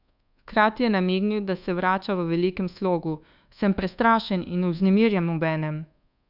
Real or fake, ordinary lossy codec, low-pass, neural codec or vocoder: fake; none; 5.4 kHz; codec, 24 kHz, 1.2 kbps, DualCodec